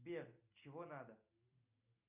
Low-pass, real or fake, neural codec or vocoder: 3.6 kHz; real; none